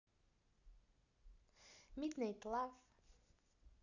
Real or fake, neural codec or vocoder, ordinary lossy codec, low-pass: real; none; none; 7.2 kHz